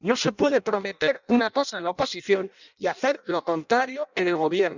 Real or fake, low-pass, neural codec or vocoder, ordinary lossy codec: fake; 7.2 kHz; codec, 16 kHz in and 24 kHz out, 0.6 kbps, FireRedTTS-2 codec; none